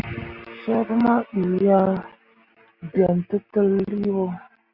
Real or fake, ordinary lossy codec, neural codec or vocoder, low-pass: real; Opus, 64 kbps; none; 5.4 kHz